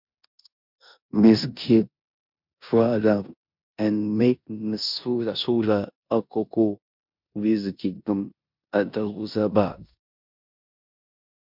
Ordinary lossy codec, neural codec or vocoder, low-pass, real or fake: MP3, 48 kbps; codec, 16 kHz in and 24 kHz out, 0.9 kbps, LongCat-Audio-Codec, four codebook decoder; 5.4 kHz; fake